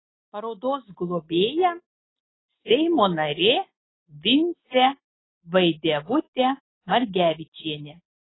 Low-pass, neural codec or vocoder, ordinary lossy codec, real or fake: 7.2 kHz; none; AAC, 16 kbps; real